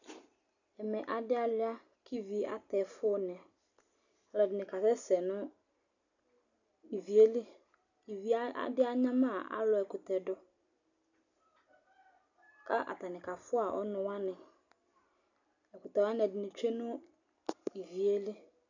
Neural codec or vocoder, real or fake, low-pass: none; real; 7.2 kHz